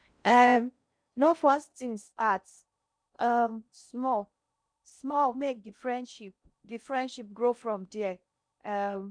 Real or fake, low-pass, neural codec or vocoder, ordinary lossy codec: fake; 9.9 kHz; codec, 16 kHz in and 24 kHz out, 0.6 kbps, FocalCodec, streaming, 4096 codes; none